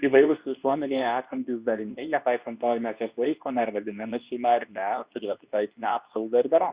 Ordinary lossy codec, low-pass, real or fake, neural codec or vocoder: Opus, 64 kbps; 3.6 kHz; fake; codec, 24 kHz, 0.9 kbps, WavTokenizer, medium speech release version 2